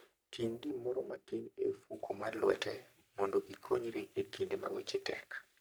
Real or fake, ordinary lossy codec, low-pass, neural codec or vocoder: fake; none; none; codec, 44.1 kHz, 3.4 kbps, Pupu-Codec